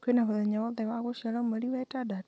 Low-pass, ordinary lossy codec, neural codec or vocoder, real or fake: none; none; none; real